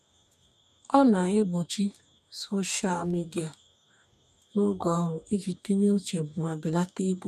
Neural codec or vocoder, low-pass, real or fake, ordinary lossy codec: codec, 44.1 kHz, 2.6 kbps, DAC; 14.4 kHz; fake; none